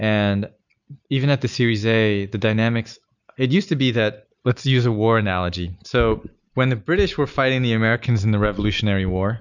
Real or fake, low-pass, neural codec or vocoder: real; 7.2 kHz; none